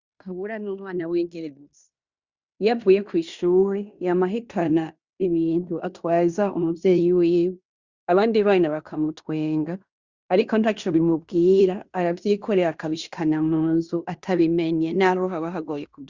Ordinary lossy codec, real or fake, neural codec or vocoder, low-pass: Opus, 64 kbps; fake; codec, 16 kHz in and 24 kHz out, 0.9 kbps, LongCat-Audio-Codec, fine tuned four codebook decoder; 7.2 kHz